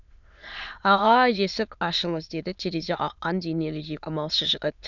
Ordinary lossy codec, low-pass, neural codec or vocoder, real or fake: none; 7.2 kHz; autoencoder, 22.05 kHz, a latent of 192 numbers a frame, VITS, trained on many speakers; fake